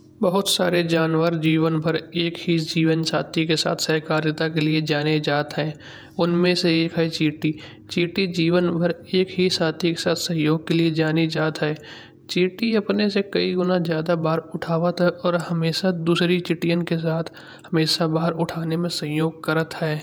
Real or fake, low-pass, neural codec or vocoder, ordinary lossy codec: fake; none; vocoder, 48 kHz, 128 mel bands, Vocos; none